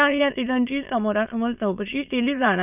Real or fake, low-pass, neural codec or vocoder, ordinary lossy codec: fake; 3.6 kHz; autoencoder, 22.05 kHz, a latent of 192 numbers a frame, VITS, trained on many speakers; none